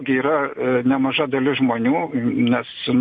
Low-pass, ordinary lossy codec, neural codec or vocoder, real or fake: 9.9 kHz; MP3, 48 kbps; none; real